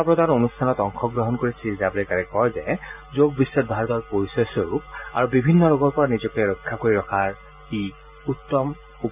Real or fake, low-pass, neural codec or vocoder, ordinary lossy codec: real; 3.6 kHz; none; none